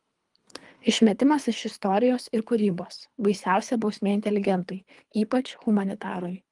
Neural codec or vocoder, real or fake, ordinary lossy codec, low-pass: codec, 24 kHz, 3 kbps, HILCodec; fake; Opus, 32 kbps; 10.8 kHz